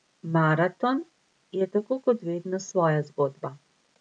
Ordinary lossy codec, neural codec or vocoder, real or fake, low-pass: none; none; real; 9.9 kHz